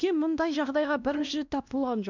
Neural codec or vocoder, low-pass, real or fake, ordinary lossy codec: codec, 16 kHz, 1 kbps, X-Codec, WavLM features, trained on Multilingual LibriSpeech; 7.2 kHz; fake; none